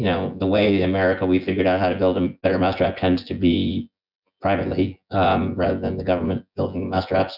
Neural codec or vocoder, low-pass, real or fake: vocoder, 24 kHz, 100 mel bands, Vocos; 5.4 kHz; fake